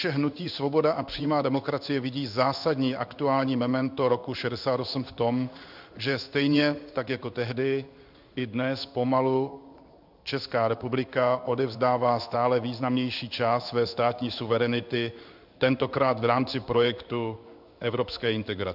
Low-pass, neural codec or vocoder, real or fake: 5.4 kHz; codec, 16 kHz in and 24 kHz out, 1 kbps, XY-Tokenizer; fake